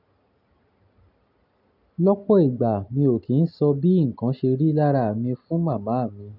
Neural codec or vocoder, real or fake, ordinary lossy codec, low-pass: none; real; none; 5.4 kHz